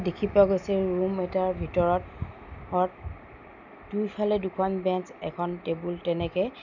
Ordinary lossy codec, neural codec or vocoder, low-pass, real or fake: none; none; 7.2 kHz; real